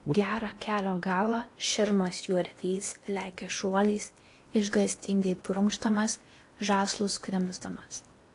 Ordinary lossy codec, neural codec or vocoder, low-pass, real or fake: AAC, 48 kbps; codec, 16 kHz in and 24 kHz out, 0.8 kbps, FocalCodec, streaming, 65536 codes; 10.8 kHz; fake